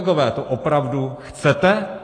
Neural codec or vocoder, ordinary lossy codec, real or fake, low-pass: none; AAC, 32 kbps; real; 9.9 kHz